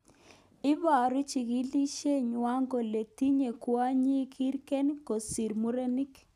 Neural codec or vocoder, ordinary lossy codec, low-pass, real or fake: none; none; 14.4 kHz; real